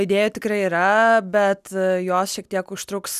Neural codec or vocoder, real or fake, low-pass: none; real; 14.4 kHz